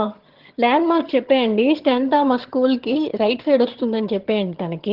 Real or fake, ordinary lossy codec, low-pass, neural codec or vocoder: fake; Opus, 16 kbps; 5.4 kHz; vocoder, 22.05 kHz, 80 mel bands, HiFi-GAN